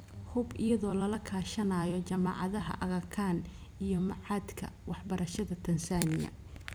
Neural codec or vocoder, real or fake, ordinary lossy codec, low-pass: vocoder, 44.1 kHz, 128 mel bands every 256 samples, BigVGAN v2; fake; none; none